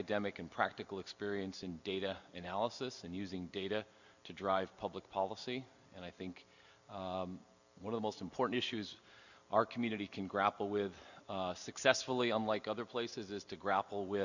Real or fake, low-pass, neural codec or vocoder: real; 7.2 kHz; none